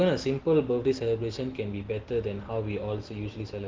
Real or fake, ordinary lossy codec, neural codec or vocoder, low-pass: real; Opus, 16 kbps; none; 7.2 kHz